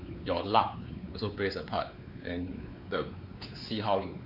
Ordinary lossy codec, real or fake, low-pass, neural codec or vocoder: none; fake; 5.4 kHz; codec, 16 kHz, 4 kbps, X-Codec, WavLM features, trained on Multilingual LibriSpeech